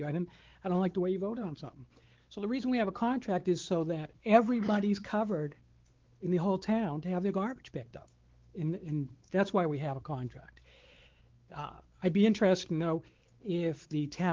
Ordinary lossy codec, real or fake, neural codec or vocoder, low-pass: Opus, 16 kbps; fake; codec, 16 kHz, 4 kbps, X-Codec, WavLM features, trained on Multilingual LibriSpeech; 7.2 kHz